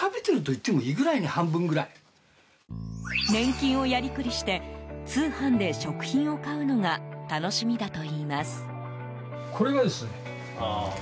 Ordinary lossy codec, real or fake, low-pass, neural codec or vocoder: none; real; none; none